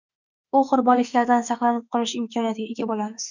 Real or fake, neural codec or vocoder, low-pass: fake; autoencoder, 48 kHz, 32 numbers a frame, DAC-VAE, trained on Japanese speech; 7.2 kHz